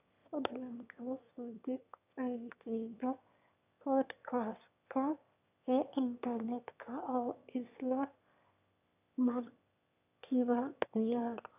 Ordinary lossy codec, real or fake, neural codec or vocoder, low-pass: none; fake; autoencoder, 22.05 kHz, a latent of 192 numbers a frame, VITS, trained on one speaker; 3.6 kHz